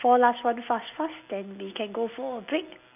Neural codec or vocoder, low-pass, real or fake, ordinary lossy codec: none; 3.6 kHz; real; none